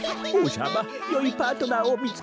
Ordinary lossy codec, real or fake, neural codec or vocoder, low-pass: none; real; none; none